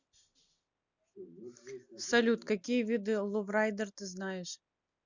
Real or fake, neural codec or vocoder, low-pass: real; none; 7.2 kHz